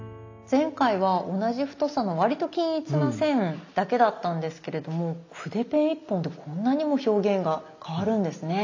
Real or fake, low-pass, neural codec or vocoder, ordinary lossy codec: real; 7.2 kHz; none; AAC, 48 kbps